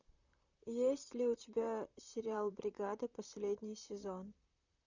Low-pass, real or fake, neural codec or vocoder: 7.2 kHz; fake; vocoder, 44.1 kHz, 128 mel bands, Pupu-Vocoder